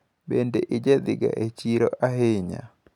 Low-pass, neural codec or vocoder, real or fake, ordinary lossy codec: 19.8 kHz; none; real; none